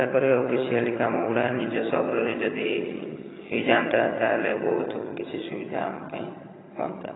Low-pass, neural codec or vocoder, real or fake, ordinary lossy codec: 7.2 kHz; vocoder, 22.05 kHz, 80 mel bands, HiFi-GAN; fake; AAC, 16 kbps